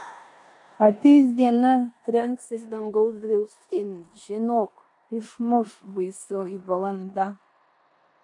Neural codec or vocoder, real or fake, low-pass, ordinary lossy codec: codec, 16 kHz in and 24 kHz out, 0.9 kbps, LongCat-Audio-Codec, four codebook decoder; fake; 10.8 kHz; AAC, 64 kbps